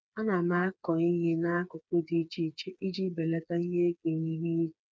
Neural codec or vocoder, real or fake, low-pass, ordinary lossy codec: codec, 16 kHz, 4 kbps, FreqCodec, smaller model; fake; none; none